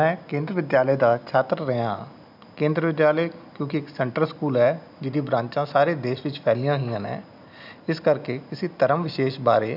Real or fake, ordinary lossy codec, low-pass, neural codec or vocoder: real; none; 5.4 kHz; none